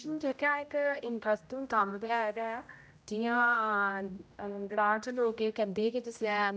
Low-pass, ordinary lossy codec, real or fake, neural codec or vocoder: none; none; fake; codec, 16 kHz, 0.5 kbps, X-Codec, HuBERT features, trained on general audio